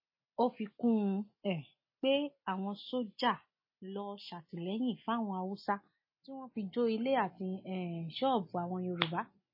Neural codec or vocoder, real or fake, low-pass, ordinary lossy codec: none; real; 5.4 kHz; MP3, 24 kbps